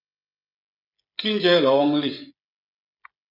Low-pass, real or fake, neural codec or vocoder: 5.4 kHz; fake; codec, 16 kHz, 16 kbps, FreqCodec, smaller model